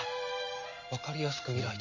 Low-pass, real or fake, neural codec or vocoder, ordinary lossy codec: 7.2 kHz; real; none; none